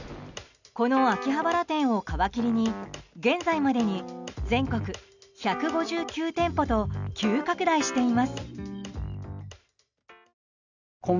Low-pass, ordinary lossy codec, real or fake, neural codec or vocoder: 7.2 kHz; none; real; none